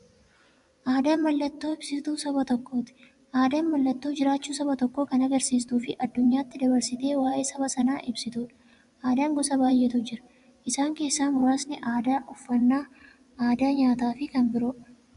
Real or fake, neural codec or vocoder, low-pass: fake; vocoder, 24 kHz, 100 mel bands, Vocos; 10.8 kHz